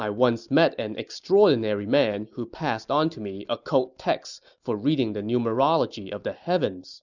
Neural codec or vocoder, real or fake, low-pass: none; real; 7.2 kHz